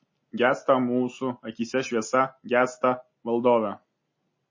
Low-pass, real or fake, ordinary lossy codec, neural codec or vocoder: 7.2 kHz; real; MP3, 32 kbps; none